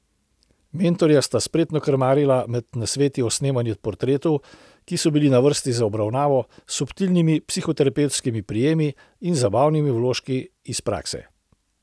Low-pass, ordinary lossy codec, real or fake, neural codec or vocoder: none; none; real; none